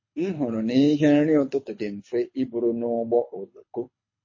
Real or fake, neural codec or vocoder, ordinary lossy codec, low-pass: fake; codec, 24 kHz, 6 kbps, HILCodec; MP3, 32 kbps; 7.2 kHz